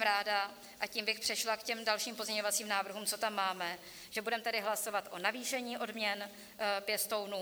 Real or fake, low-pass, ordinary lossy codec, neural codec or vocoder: fake; 19.8 kHz; MP3, 96 kbps; vocoder, 44.1 kHz, 128 mel bands every 256 samples, BigVGAN v2